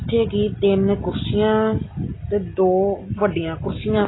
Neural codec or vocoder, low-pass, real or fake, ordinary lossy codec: none; 7.2 kHz; real; AAC, 16 kbps